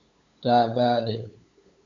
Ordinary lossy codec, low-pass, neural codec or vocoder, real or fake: MP3, 64 kbps; 7.2 kHz; codec, 16 kHz, 8 kbps, FunCodec, trained on LibriTTS, 25 frames a second; fake